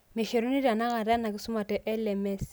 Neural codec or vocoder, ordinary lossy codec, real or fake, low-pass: none; none; real; none